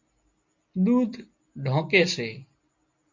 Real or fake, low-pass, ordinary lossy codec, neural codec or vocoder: real; 7.2 kHz; MP3, 48 kbps; none